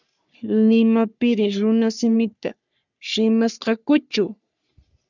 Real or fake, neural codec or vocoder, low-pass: fake; codec, 44.1 kHz, 3.4 kbps, Pupu-Codec; 7.2 kHz